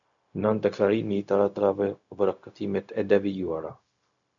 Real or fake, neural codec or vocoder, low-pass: fake; codec, 16 kHz, 0.4 kbps, LongCat-Audio-Codec; 7.2 kHz